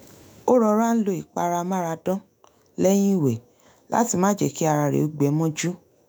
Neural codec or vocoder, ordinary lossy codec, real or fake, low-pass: autoencoder, 48 kHz, 128 numbers a frame, DAC-VAE, trained on Japanese speech; none; fake; none